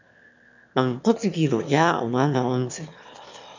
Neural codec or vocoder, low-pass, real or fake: autoencoder, 22.05 kHz, a latent of 192 numbers a frame, VITS, trained on one speaker; 7.2 kHz; fake